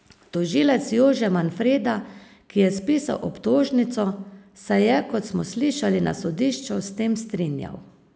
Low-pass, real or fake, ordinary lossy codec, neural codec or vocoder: none; real; none; none